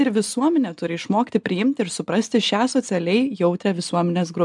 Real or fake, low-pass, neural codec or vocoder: real; 10.8 kHz; none